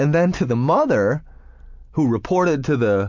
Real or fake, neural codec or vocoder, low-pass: real; none; 7.2 kHz